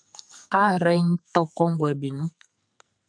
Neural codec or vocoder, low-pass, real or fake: codec, 44.1 kHz, 2.6 kbps, SNAC; 9.9 kHz; fake